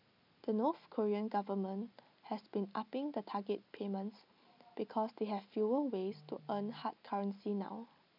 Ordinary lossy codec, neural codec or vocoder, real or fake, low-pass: none; none; real; 5.4 kHz